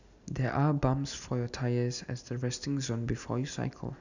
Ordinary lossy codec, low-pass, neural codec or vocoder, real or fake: none; 7.2 kHz; none; real